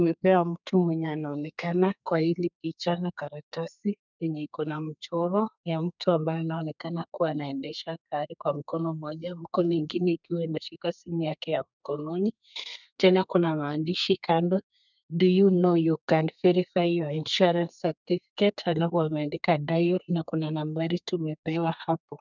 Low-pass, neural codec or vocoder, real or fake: 7.2 kHz; codec, 32 kHz, 1.9 kbps, SNAC; fake